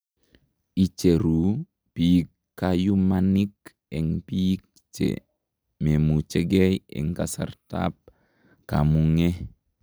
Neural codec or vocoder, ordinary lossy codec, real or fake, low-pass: none; none; real; none